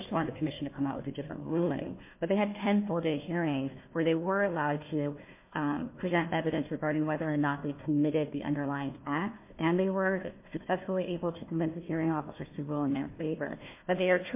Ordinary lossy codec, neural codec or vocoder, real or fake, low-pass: MP3, 24 kbps; codec, 16 kHz, 1 kbps, FunCodec, trained on Chinese and English, 50 frames a second; fake; 3.6 kHz